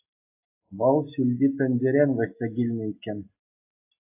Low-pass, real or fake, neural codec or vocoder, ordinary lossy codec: 3.6 kHz; real; none; AAC, 32 kbps